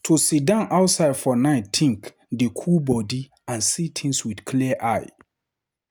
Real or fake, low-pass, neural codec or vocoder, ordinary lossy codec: real; none; none; none